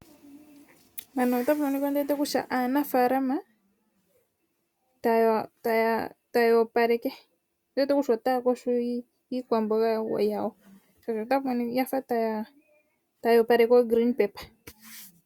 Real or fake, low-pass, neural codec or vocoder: real; 19.8 kHz; none